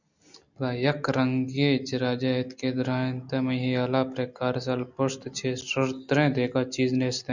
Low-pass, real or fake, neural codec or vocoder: 7.2 kHz; real; none